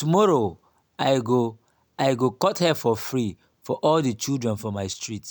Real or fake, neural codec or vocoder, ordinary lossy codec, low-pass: real; none; none; none